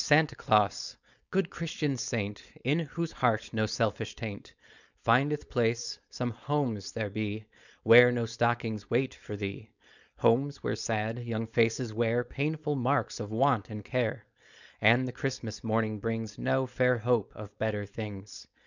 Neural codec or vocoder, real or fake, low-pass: codec, 16 kHz, 4.8 kbps, FACodec; fake; 7.2 kHz